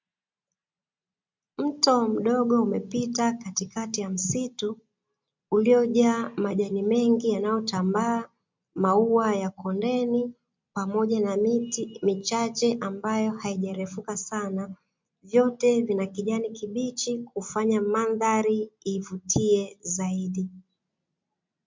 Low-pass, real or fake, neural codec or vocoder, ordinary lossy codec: 7.2 kHz; real; none; MP3, 64 kbps